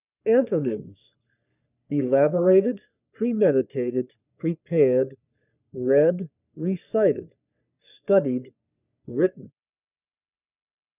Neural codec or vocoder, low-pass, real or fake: codec, 44.1 kHz, 3.4 kbps, Pupu-Codec; 3.6 kHz; fake